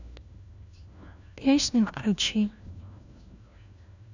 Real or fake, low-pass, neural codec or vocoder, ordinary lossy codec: fake; 7.2 kHz; codec, 16 kHz, 1 kbps, FunCodec, trained on LibriTTS, 50 frames a second; none